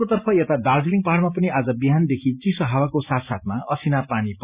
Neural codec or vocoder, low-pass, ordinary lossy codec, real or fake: none; 3.6 kHz; Opus, 64 kbps; real